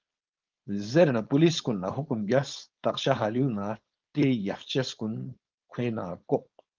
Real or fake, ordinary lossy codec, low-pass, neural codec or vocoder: fake; Opus, 24 kbps; 7.2 kHz; codec, 16 kHz, 4.8 kbps, FACodec